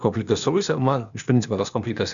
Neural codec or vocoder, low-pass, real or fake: codec, 16 kHz, 0.8 kbps, ZipCodec; 7.2 kHz; fake